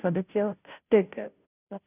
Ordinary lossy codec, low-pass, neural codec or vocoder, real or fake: none; 3.6 kHz; codec, 16 kHz, 0.5 kbps, FunCodec, trained on Chinese and English, 25 frames a second; fake